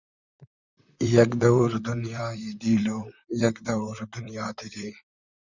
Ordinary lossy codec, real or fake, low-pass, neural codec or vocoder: Opus, 64 kbps; fake; 7.2 kHz; vocoder, 44.1 kHz, 128 mel bands, Pupu-Vocoder